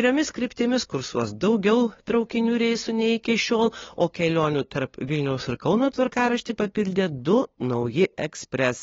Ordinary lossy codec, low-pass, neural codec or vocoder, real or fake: AAC, 24 kbps; 7.2 kHz; codec, 16 kHz, 2 kbps, FunCodec, trained on LibriTTS, 25 frames a second; fake